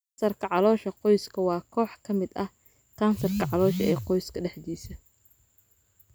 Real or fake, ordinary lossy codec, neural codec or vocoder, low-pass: real; none; none; none